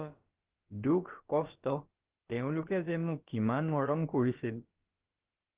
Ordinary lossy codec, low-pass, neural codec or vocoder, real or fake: Opus, 16 kbps; 3.6 kHz; codec, 16 kHz, about 1 kbps, DyCAST, with the encoder's durations; fake